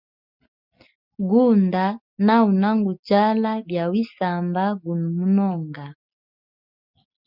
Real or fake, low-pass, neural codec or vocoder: real; 5.4 kHz; none